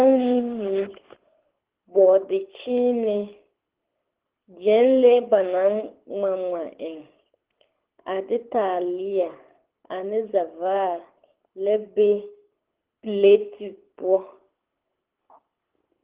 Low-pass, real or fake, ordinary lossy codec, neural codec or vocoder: 3.6 kHz; fake; Opus, 16 kbps; codec, 24 kHz, 6 kbps, HILCodec